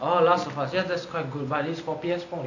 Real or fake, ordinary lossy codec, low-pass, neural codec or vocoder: real; none; 7.2 kHz; none